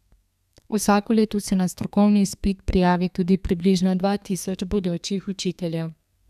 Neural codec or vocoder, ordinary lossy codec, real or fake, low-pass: codec, 32 kHz, 1.9 kbps, SNAC; none; fake; 14.4 kHz